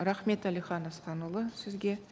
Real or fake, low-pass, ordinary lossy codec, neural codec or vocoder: real; none; none; none